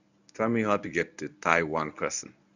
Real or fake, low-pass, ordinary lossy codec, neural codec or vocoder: fake; 7.2 kHz; none; codec, 24 kHz, 0.9 kbps, WavTokenizer, medium speech release version 1